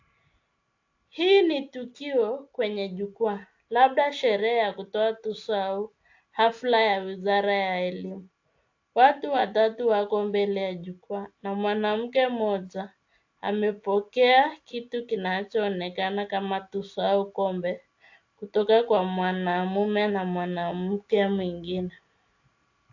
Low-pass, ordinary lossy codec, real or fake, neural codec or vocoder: 7.2 kHz; AAC, 48 kbps; real; none